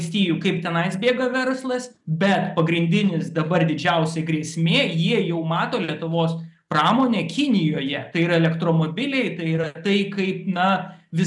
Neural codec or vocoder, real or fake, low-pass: none; real; 10.8 kHz